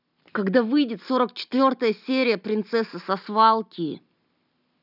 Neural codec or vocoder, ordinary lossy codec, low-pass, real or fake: none; none; 5.4 kHz; real